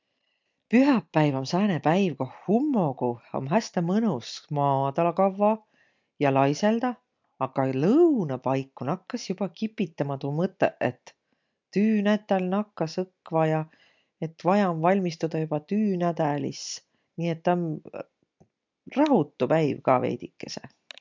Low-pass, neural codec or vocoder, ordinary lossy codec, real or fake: 7.2 kHz; none; MP3, 64 kbps; real